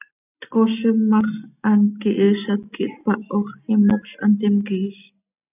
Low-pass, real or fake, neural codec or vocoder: 3.6 kHz; real; none